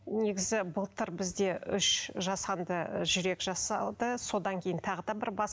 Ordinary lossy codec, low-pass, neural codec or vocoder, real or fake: none; none; none; real